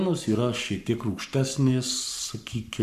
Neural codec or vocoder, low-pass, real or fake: codec, 44.1 kHz, 7.8 kbps, Pupu-Codec; 14.4 kHz; fake